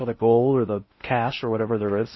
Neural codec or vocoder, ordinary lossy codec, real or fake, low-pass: codec, 16 kHz in and 24 kHz out, 0.6 kbps, FocalCodec, streaming, 4096 codes; MP3, 24 kbps; fake; 7.2 kHz